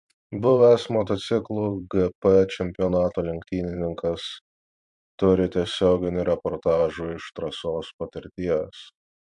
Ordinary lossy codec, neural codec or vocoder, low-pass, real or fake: MP3, 64 kbps; vocoder, 44.1 kHz, 128 mel bands every 512 samples, BigVGAN v2; 10.8 kHz; fake